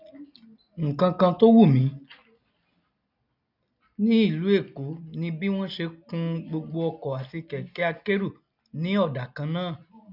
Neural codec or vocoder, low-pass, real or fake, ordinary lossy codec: none; 5.4 kHz; real; none